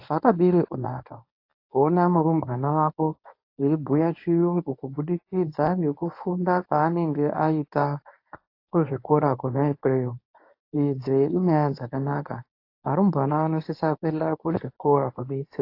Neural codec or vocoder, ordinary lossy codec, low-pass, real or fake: codec, 24 kHz, 0.9 kbps, WavTokenizer, medium speech release version 1; AAC, 32 kbps; 5.4 kHz; fake